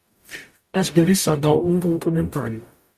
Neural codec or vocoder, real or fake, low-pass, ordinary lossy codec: codec, 44.1 kHz, 0.9 kbps, DAC; fake; 14.4 kHz; Opus, 64 kbps